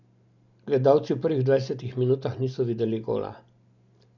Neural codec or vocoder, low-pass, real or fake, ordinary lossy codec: none; 7.2 kHz; real; none